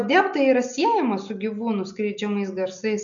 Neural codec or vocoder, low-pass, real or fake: none; 7.2 kHz; real